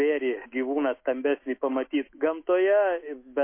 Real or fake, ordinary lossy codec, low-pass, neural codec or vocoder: fake; MP3, 24 kbps; 3.6 kHz; autoencoder, 48 kHz, 128 numbers a frame, DAC-VAE, trained on Japanese speech